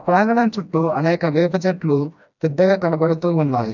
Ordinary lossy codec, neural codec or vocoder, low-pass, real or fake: none; codec, 16 kHz, 1 kbps, FreqCodec, smaller model; 7.2 kHz; fake